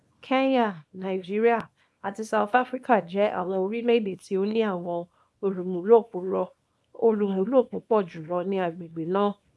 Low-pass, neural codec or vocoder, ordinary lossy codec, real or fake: none; codec, 24 kHz, 0.9 kbps, WavTokenizer, small release; none; fake